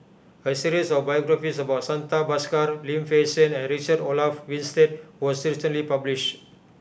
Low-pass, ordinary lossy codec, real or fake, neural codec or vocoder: none; none; real; none